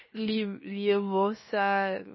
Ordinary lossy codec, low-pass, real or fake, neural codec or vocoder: MP3, 24 kbps; 7.2 kHz; fake; codec, 16 kHz, 0.7 kbps, FocalCodec